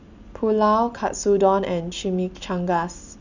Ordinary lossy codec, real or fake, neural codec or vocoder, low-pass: none; real; none; 7.2 kHz